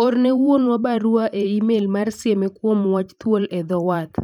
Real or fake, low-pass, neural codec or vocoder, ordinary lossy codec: fake; 19.8 kHz; vocoder, 44.1 kHz, 128 mel bands every 512 samples, BigVGAN v2; none